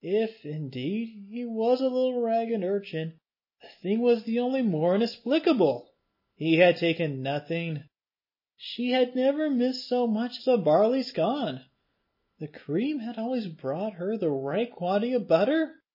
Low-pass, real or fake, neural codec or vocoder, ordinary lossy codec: 5.4 kHz; real; none; MP3, 24 kbps